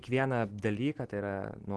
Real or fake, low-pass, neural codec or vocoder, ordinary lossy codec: real; 10.8 kHz; none; Opus, 16 kbps